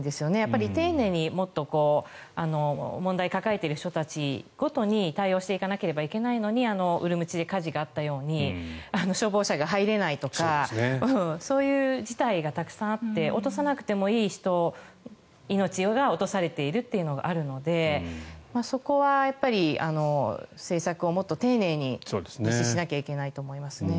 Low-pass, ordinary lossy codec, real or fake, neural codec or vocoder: none; none; real; none